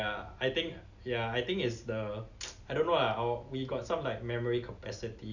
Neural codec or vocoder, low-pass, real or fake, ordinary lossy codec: none; 7.2 kHz; real; none